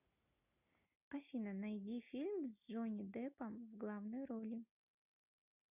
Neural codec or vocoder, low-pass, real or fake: none; 3.6 kHz; real